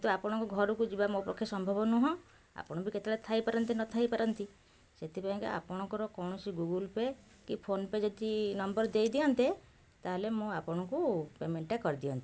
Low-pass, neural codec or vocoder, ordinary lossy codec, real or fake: none; none; none; real